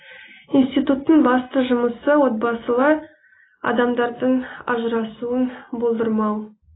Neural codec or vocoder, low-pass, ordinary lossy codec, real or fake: none; 7.2 kHz; AAC, 16 kbps; real